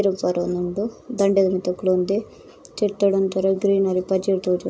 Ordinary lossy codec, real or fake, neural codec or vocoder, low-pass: none; real; none; none